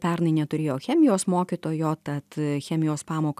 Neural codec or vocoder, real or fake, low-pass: none; real; 14.4 kHz